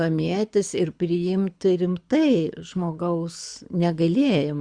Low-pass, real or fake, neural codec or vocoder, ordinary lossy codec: 9.9 kHz; fake; codec, 24 kHz, 6 kbps, HILCodec; Opus, 64 kbps